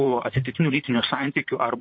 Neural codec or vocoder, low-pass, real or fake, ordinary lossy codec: vocoder, 44.1 kHz, 128 mel bands, Pupu-Vocoder; 7.2 kHz; fake; MP3, 32 kbps